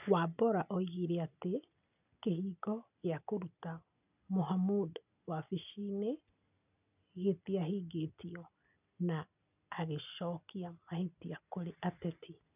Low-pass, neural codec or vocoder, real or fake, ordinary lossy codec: 3.6 kHz; none; real; none